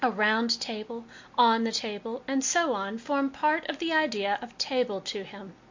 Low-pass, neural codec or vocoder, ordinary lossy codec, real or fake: 7.2 kHz; none; MP3, 48 kbps; real